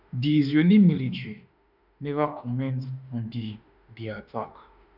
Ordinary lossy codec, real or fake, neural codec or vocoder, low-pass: none; fake; autoencoder, 48 kHz, 32 numbers a frame, DAC-VAE, trained on Japanese speech; 5.4 kHz